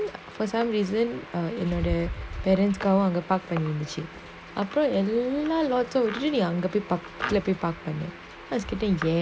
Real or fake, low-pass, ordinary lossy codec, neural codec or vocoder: real; none; none; none